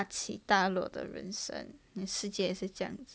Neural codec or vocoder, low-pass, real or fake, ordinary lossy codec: none; none; real; none